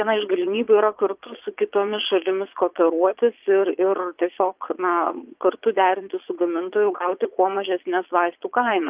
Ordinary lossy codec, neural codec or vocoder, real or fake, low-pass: Opus, 32 kbps; vocoder, 22.05 kHz, 80 mel bands, Vocos; fake; 3.6 kHz